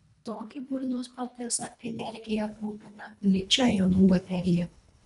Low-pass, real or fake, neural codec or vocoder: 10.8 kHz; fake; codec, 24 kHz, 1.5 kbps, HILCodec